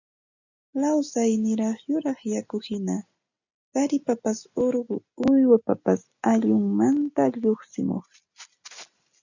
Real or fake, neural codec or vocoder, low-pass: real; none; 7.2 kHz